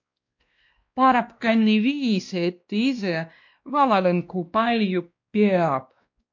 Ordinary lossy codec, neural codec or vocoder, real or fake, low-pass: MP3, 48 kbps; codec, 16 kHz, 1 kbps, X-Codec, WavLM features, trained on Multilingual LibriSpeech; fake; 7.2 kHz